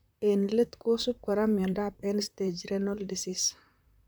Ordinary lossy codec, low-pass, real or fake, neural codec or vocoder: none; none; fake; vocoder, 44.1 kHz, 128 mel bands, Pupu-Vocoder